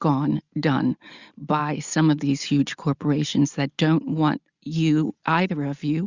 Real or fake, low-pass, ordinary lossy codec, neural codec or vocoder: real; 7.2 kHz; Opus, 64 kbps; none